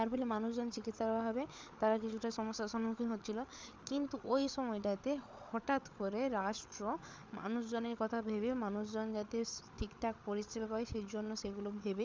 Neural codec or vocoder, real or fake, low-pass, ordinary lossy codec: codec, 16 kHz, 8 kbps, FreqCodec, larger model; fake; none; none